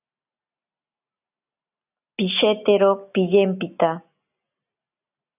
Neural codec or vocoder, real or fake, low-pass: none; real; 3.6 kHz